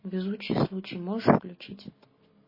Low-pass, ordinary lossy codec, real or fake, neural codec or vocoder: 5.4 kHz; MP3, 24 kbps; real; none